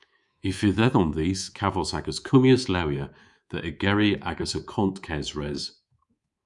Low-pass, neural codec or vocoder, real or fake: 10.8 kHz; codec, 24 kHz, 3.1 kbps, DualCodec; fake